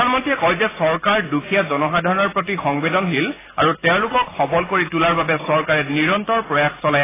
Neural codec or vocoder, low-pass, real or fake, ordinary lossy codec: none; 3.6 kHz; real; AAC, 16 kbps